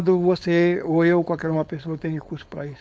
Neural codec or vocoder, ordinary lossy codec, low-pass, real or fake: codec, 16 kHz, 4.8 kbps, FACodec; none; none; fake